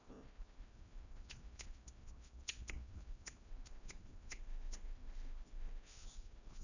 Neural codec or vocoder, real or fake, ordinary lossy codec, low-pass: codec, 16 kHz, 1 kbps, FreqCodec, larger model; fake; none; 7.2 kHz